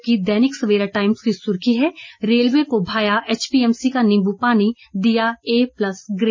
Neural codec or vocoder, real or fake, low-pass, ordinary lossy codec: none; real; 7.2 kHz; MP3, 32 kbps